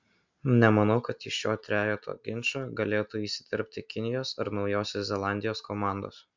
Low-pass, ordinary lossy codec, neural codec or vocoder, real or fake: 7.2 kHz; MP3, 64 kbps; none; real